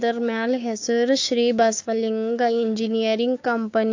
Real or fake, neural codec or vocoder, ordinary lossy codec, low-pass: fake; codec, 16 kHz, 6 kbps, DAC; AAC, 48 kbps; 7.2 kHz